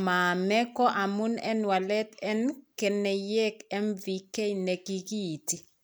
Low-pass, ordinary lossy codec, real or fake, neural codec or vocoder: none; none; real; none